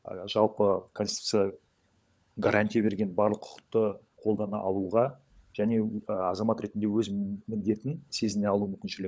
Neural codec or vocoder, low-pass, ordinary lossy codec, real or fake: codec, 16 kHz, 16 kbps, FunCodec, trained on LibriTTS, 50 frames a second; none; none; fake